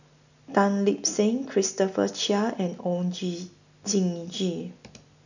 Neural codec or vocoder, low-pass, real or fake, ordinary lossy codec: none; 7.2 kHz; real; none